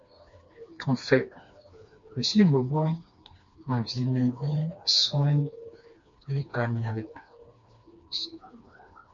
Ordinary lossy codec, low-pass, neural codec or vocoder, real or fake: MP3, 48 kbps; 7.2 kHz; codec, 16 kHz, 2 kbps, FreqCodec, smaller model; fake